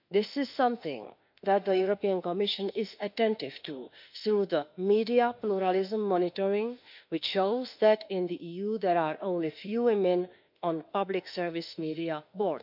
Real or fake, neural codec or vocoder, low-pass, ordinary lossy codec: fake; autoencoder, 48 kHz, 32 numbers a frame, DAC-VAE, trained on Japanese speech; 5.4 kHz; none